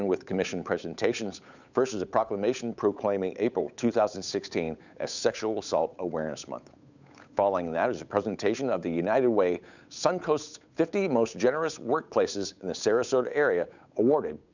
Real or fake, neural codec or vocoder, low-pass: fake; codec, 16 kHz, 8 kbps, FunCodec, trained on Chinese and English, 25 frames a second; 7.2 kHz